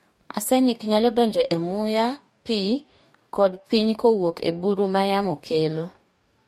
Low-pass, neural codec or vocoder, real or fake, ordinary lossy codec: 19.8 kHz; codec, 44.1 kHz, 2.6 kbps, DAC; fake; MP3, 64 kbps